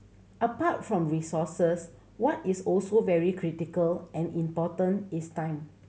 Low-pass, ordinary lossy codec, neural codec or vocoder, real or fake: none; none; none; real